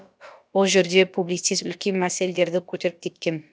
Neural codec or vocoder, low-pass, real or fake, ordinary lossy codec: codec, 16 kHz, about 1 kbps, DyCAST, with the encoder's durations; none; fake; none